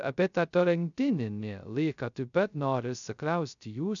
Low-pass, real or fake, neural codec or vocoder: 7.2 kHz; fake; codec, 16 kHz, 0.2 kbps, FocalCodec